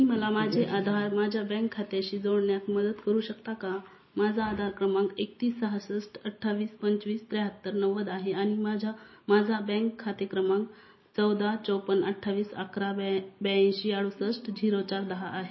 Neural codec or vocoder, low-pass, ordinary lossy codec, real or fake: none; 7.2 kHz; MP3, 24 kbps; real